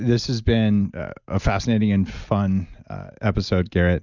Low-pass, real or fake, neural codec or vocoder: 7.2 kHz; real; none